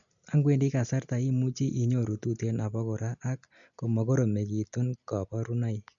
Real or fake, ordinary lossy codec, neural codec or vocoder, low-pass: real; none; none; 7.2 kHz